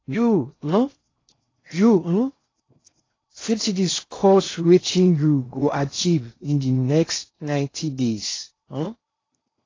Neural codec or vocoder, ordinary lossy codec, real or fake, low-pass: codec, 16 kHz in and 24 kHz out, 0.6 kbps, FocalCodec, streaming, 2048 codes; AAC, 32 kbps; fake; 7.2 kHz